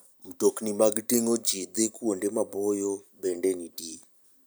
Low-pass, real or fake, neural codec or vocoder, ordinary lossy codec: none; real; none; none